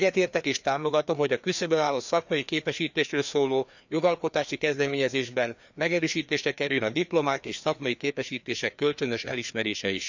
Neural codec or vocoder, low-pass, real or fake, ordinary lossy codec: codec, 16 kHz, 2 kbps, FreqCodec, larger model; 7.2 kHz; fake; none